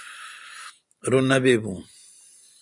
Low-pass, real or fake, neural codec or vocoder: 10.8 kHz; fake; vocoder, 44.1 kHz, 128 mel bands every 512 samples, BigVGAN v2